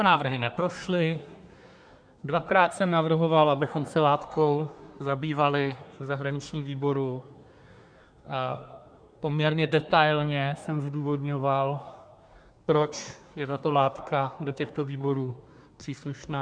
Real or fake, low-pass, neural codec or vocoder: fake; 9.9 kHz; codec, 24 kHz, 1 kbps, SNAC